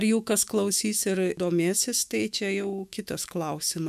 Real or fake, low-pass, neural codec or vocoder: fake; 14.4 kHz; autoencoder, 48 kHz, 128 numbers a frame, DAC-VAE, trained on Japanese speech